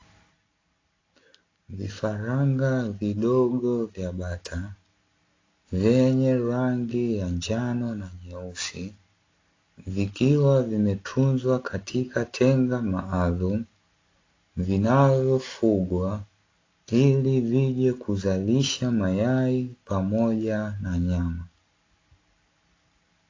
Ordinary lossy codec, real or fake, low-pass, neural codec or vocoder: AAC, 32 kbps; real; 7.2 kHz; none